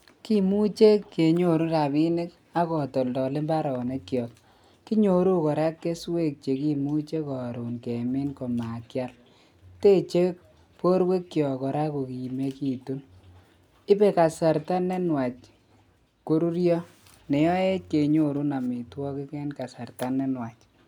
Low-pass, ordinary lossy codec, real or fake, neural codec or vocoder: 19.8 kHz; none; real; none